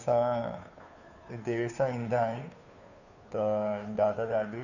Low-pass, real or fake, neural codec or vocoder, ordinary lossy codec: 7.2 kHz; fake; codec, 16 kHz, 2 kbps, FunCodec, trained on Chinese and English, 25 frames a second; none